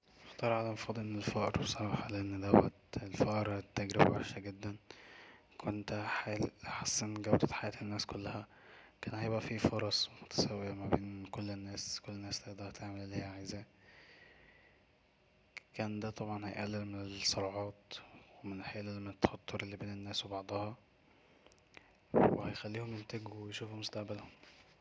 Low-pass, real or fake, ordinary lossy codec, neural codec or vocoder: none; real; none; none